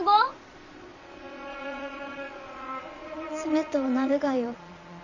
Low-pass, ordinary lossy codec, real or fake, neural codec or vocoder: 7.2 kHz; Opus, 64 kbps; fake; vocoder, 22.05 kHz, 80 mel bands, Vocos